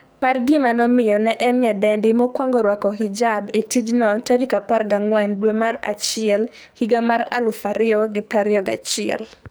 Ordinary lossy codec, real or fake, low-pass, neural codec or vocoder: none; fake; none; codec, 44.1 kHz, 2.6 kbps, SNAC